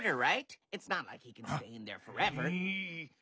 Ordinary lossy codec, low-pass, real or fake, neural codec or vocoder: none; none; real; none